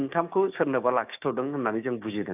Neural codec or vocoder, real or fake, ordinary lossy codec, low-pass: codec, 16 kHz, 6 kbps, DAC; fake; AAC, 32 kbps; 3.6 kHz